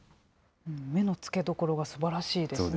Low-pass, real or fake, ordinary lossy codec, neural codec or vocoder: none; real; none; none